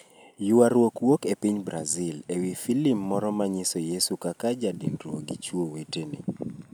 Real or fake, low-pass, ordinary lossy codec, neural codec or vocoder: real; none; none; none